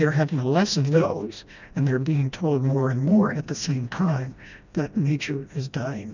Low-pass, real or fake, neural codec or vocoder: 7.2 kHz; fake; codec, 16 kHz, 1 kbps, FreqCodec, smaller model